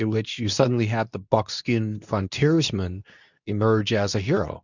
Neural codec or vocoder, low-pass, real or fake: codec, 24 kHz, 0.9 kbps, WavTokenizer, medium speech release version 2; 7.2 kHz; fake